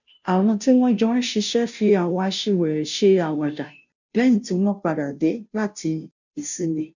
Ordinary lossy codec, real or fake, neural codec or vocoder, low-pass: none; fake; codec, 16 kHz, 0.5 kbps, FunCodec, trained on Chinese and English, 25 frames a second; 7.2 kHz